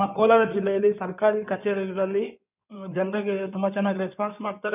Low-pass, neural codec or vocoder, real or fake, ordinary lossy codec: 3.6 kHz; codec, 16 kHz in and 24 kHz out, 2.2 kbps, FireRedTTS-2 codec; fake; none